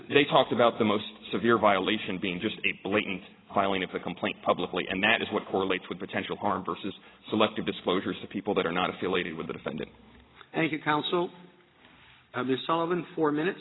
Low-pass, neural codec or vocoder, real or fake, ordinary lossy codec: 7.2 kHz; none; real; AAC, 16 kbps